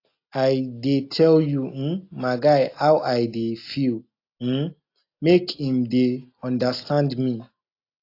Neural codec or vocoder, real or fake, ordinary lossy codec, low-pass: none; real; AAC, 32 kbps; 5.4 kHz